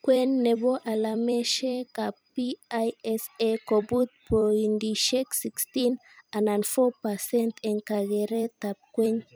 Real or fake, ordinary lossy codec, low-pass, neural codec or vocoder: fake; none; none; vocoder, 44.1 kHz, 128 mel bands every 256 samples, BigVGAN v2